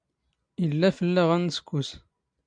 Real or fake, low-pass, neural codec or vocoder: real; 9.9 kHz; none